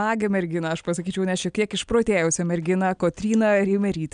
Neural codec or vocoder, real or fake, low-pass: none; real; 9.9 kHz